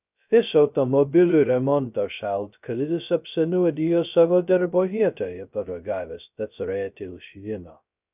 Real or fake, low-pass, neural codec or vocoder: fake; 3.6 kHz; codec, 16 kHz, 0.2 kbps, FocalCodec